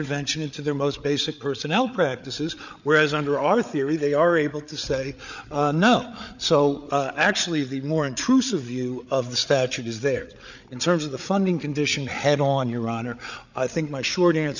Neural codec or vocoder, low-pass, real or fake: codec, 16 kHz, 8 kbps, FreqCodec, larger model; 7.2 kHz; fake